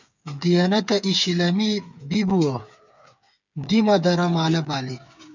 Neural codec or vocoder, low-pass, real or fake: codec, 16 kHz, 8 kbps, FreqCodec, smaller model; 7.2 kHz; fake